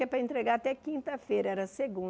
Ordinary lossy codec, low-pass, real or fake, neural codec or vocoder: none; none; real; none